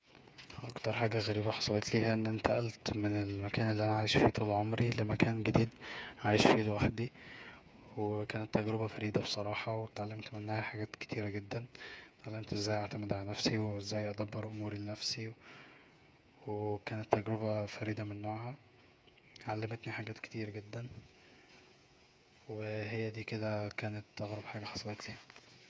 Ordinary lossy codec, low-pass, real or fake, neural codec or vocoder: none; none; fake; codec, 16 kHz, 8 kbps, FreqCodec, smaller model